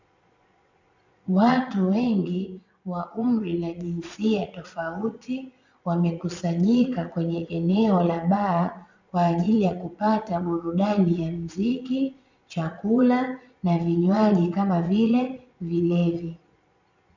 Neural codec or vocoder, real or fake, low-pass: vocoder, 22.05 kHz, 80 mel bands, Vocos; fake; 7.2 kHz